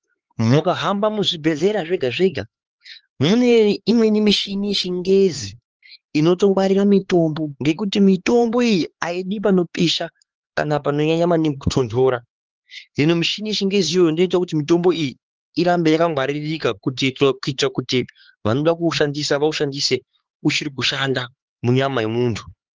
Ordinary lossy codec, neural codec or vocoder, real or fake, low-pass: Opus, 16 kbps; codec, 16 kHz, 4 kbps, X-Codec, HuBERT features, trained on LibriSpeech; fake; 7.2 kHz